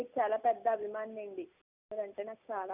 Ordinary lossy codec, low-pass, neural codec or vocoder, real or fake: none; 3.6 kHz; none; real